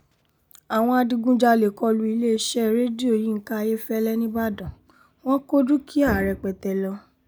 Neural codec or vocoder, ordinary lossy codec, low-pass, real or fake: none; none; none; real